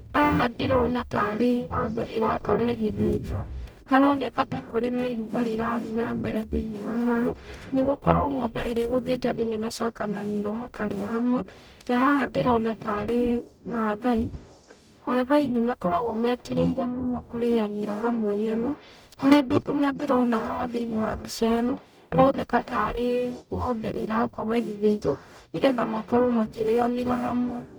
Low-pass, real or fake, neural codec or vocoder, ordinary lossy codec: none; fake; codec, 44.1 kHz, 0.9 kbps, DAC; none